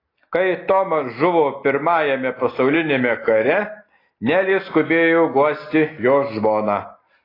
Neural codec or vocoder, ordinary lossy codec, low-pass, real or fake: none; AAC, 24 kbps; 5.4 kHz; real